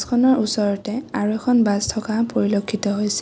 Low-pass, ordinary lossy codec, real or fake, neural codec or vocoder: none; none; real; none